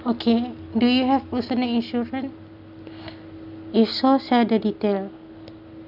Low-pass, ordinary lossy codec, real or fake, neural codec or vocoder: 5.4 kHz; none; real; none